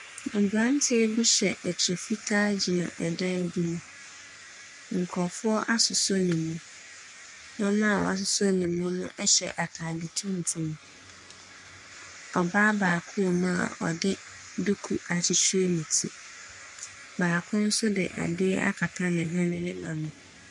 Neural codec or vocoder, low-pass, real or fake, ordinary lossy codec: codec, 44.1 kHz, 3.4 kbps, Pupu-Codec; 10.8 kHz; fake; MP3, 64 kbps